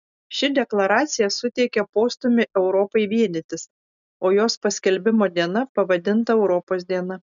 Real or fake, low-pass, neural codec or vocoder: real; 7.2 kHz; none